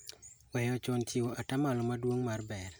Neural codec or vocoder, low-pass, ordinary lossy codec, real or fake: none; none; none; real